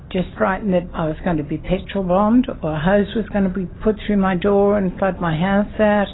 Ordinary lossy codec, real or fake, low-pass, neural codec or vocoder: AAC, 16 kbps; real; 7.2 kHz; none